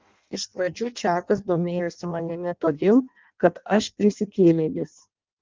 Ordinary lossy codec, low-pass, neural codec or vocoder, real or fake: Opus, 24 kbps; 7.2 kHz; codec, 16 kHz in and 24 kHz out, 0.6 kbps, FireRedTTS-2 codec; fake